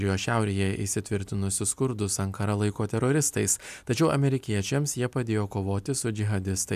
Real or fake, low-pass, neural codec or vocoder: real; 14.4 kHz; none